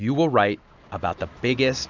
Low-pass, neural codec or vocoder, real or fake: 7.2 kHz; none; real